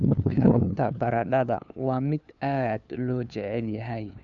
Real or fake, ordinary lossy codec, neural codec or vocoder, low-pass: fake; none; codec, 16 kHz, 2 kbps, FunCodec, trained on LibriTTS, 25 frames a second; 7.2 kHz